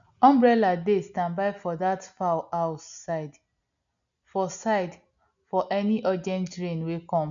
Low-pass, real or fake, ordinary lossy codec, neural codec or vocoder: 7.2 kHz; real; none; none